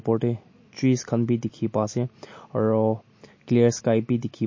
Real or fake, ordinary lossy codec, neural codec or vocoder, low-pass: real; MP3, 32 kbps; none; 7.2 kHz